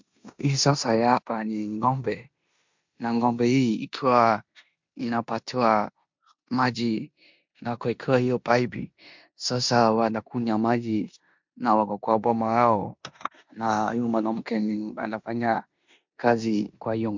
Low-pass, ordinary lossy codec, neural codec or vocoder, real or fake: 7.2 kHz; MP3, 64 kbps; codec, 16 kHz in and 24 kHz out, 0.9 kbps, LongCat-Audio-Codec, four codebook decoder; fake